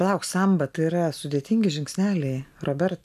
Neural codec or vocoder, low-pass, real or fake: none; 14.4 kHz; real